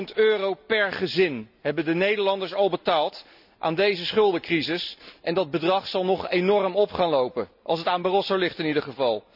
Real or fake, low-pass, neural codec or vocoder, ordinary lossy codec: real; 5.4 kHz; none; none